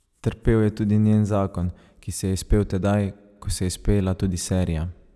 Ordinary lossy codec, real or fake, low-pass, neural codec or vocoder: none; real; none; none